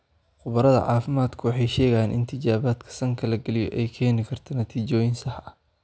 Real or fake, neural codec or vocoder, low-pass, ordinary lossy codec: real; none; none; none